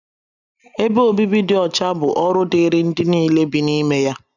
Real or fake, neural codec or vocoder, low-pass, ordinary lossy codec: real; none; 7.2 kHz; none